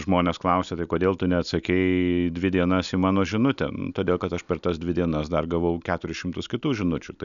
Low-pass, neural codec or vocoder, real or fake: 7.2 kHz; none; real